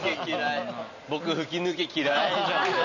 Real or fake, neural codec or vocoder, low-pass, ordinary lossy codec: real; none; 7.2 kHz; none